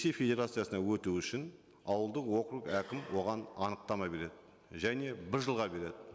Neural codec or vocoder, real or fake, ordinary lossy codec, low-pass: none; real; none; none